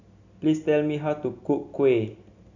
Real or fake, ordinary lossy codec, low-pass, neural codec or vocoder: real; none; 7.2 kHz; none